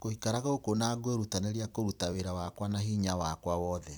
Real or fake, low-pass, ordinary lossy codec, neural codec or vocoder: fake; none; none; vocoder, 44.1 kHz, 128 mel bands every 256 samples, BigVGAN v2